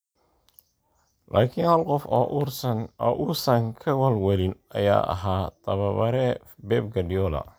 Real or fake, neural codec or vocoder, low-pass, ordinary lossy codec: real; none; none; none